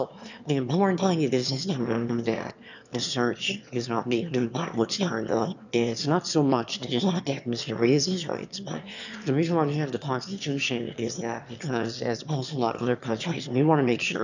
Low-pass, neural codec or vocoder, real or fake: 7.2 kHz; autoencoder, 22.05 kHz, a latent of 192 numbers a frame, VITS, trained on one speaker; fake